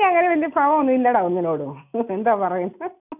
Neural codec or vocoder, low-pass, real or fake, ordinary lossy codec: none; 3.6 kHz; real; none